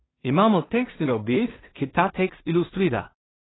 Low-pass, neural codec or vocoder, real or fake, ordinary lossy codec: 7.2 kHz; codec, 16 kHz in and 24 kHz out, 0.4 kbps, LongCat-Audio-Codec, two codebook decoder; fake; AAC, 16 kbps